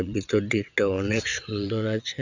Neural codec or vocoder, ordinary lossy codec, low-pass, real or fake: vocoder, 22.05 kHz, 80 mel bands, WaveNeXt; none; 7.2 kHz; fake